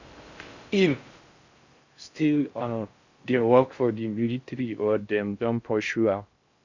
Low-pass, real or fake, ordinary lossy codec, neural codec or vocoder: 7.2 kHz; fake; Opus, 64 kbps; codec, 16 kHz in and 24 kHz out, 0.6 kbps, FocalCodec, streaming, 4096 codes